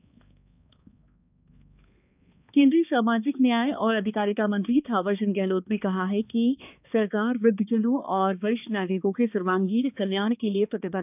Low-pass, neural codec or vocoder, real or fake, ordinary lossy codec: 3.6 kHz; codec, 16 kHz, 2 kbps, X-Codec, HuBERT features, trained on balanced general audio; fake; none